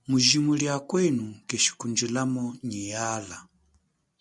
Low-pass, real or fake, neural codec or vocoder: 10.8 kHz; real; none